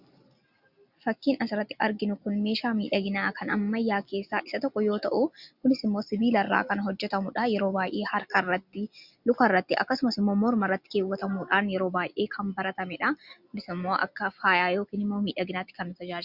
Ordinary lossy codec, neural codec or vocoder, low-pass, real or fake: Opus, 64 kbps; none; 5.4 kHz; real